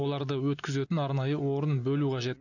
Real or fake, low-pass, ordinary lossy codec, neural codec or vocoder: real; 7.2 kHz; none; none